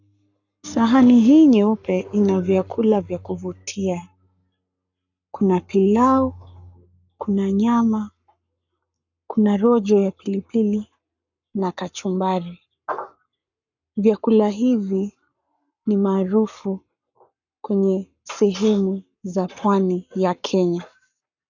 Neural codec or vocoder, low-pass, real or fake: codec, 44.1 kHz, 7.8 kbps, Pupu-Codec; 7.2 kHz; fake